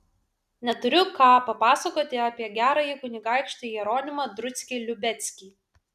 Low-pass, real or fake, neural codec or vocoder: 14.4 kHz; real; none